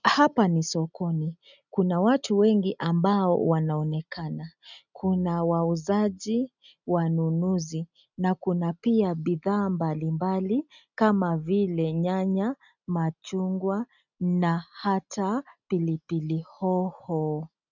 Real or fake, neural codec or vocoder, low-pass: real; none; 7.2 kHz